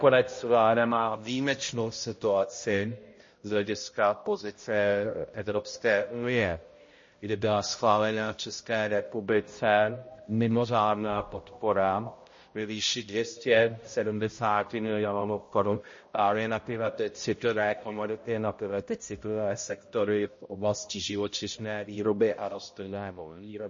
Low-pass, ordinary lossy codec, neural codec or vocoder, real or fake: 7.2 kHz; MP3, 32 kbps; codec, 16 kHz, 0.5 kbps, X-Codec, HuBERT features, trained on balanced general audio; fake